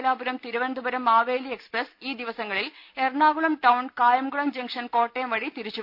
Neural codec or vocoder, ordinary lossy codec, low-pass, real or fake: none; none; 5.4 kHz; real